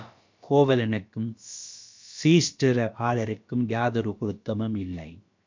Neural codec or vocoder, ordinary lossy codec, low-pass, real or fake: codec, 16 kHz, about 1 kbps, DyCAST, with the encoder's durations; MP3, 64 kbps; 7.2 kHz; fake